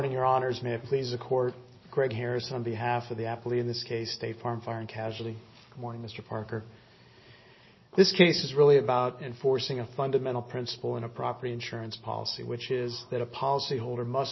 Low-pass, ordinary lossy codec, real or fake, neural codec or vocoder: 7.2 kHz; MP3, 24 kbps; real; none